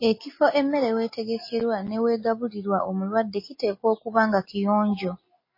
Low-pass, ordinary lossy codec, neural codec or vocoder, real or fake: 5.4 kHz; MP3, 24 kbps; none; real